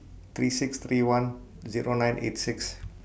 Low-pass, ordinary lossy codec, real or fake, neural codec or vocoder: none; none; real; none